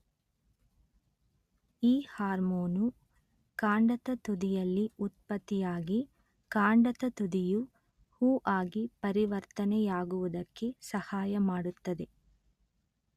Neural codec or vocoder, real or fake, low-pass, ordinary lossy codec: none; real; 14.4 kHz; Opus, 64 kbps